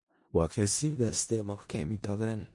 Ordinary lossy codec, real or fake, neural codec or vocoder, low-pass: MP3, 48 kbps; fake; codec, 16 kHz in and 24 kHz out, 0.4 kbps, LongCat-Audio-Codec, four codebook decoder; 10.8 kHz